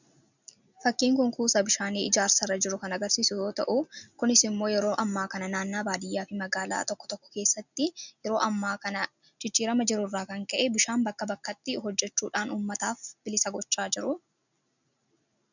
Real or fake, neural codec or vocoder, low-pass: real; none; 7.2 kHz